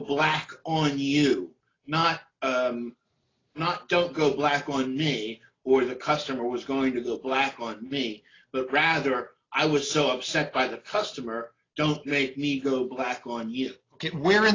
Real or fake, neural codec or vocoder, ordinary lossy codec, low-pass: real; none; AAC, 32 kbps; 7.2 kHz